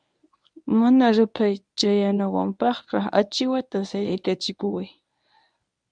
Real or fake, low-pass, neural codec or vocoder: fake; 9.9 kHz; codec, 24 kHz, 0.9 kbps, WavTokenizer, medium speech release version 1